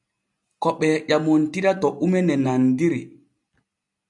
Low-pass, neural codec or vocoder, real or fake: 10.8 kHz; none; real